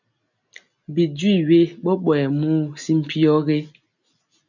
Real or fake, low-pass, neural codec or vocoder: real; 7.2 kHz; none